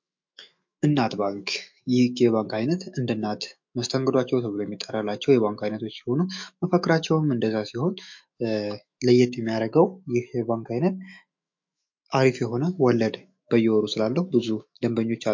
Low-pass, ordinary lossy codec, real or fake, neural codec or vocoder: 7.2 kHz; MP3, 48 kbps; fake; autoencoder, 48 kHz, 128 numbers a frame, DAC-VAE, trained on Japanese speech